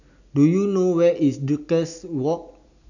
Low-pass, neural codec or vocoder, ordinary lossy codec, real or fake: 7.2 kHz; none; none; real